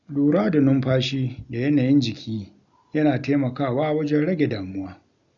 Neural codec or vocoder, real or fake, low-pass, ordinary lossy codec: none; real; 7.2 kHz; none